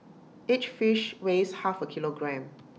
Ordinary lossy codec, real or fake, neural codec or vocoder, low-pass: none; real; none; none